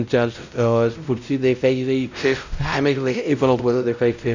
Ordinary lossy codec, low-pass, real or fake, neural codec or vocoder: none; 7.2 kHz; fake; codec, 16 kHz, 0.5 kbps, X-Codec, WavLM features, trained on Multilingual LibriSpeech